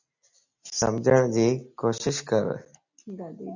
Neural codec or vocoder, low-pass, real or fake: none; 7.2 kHz; real